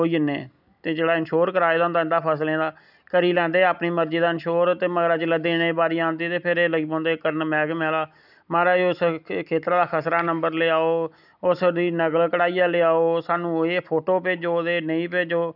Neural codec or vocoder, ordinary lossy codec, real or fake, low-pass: none; none; real; 5.4 kHz